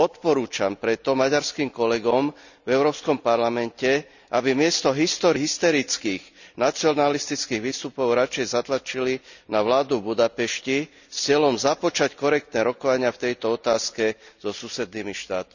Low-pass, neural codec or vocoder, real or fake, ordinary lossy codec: 7.2 kHz; none; real; none